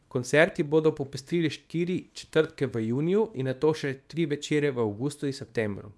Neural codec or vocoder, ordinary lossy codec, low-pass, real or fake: codec, 24 kHz, 0.9 kbps, WavTokenizer, small release; none; none; fake